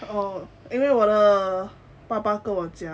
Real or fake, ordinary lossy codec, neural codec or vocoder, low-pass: real; none; none; none